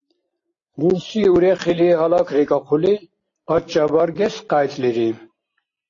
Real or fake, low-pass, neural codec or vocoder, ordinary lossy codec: real; 7.2 kHz; none; AAC, 32 kbps